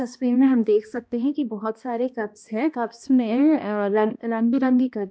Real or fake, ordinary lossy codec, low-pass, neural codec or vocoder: fake; none; none; codec, 16 kHz, 1 kbps, X-Codec, HuBERT features, trained on balanced general audio